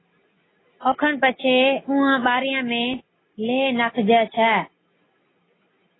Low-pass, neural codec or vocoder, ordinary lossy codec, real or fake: 7.2 kHz; none; AAC, 16 kbps; real